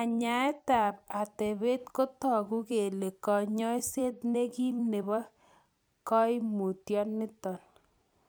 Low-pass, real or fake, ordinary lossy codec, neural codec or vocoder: none; fake; none; vocoder, 44.1 kHz, 128 mel bands every 256 samples, BigVGAN v2